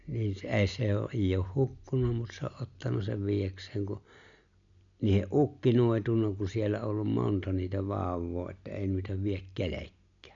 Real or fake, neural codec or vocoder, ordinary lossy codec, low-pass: real; none; none; 7.2 kHz